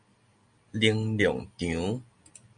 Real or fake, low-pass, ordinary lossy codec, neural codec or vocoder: real; 9.9 kHz; MP3, 64 kbps; none